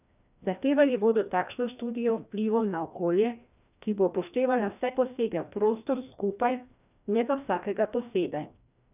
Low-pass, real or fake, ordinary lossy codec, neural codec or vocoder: 3.6 kHz; fake; none; codec, 16 kHz, 1 kbps, FreqCodec, larger model